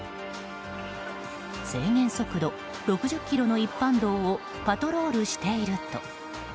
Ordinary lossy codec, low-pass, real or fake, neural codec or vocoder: none; none; real; none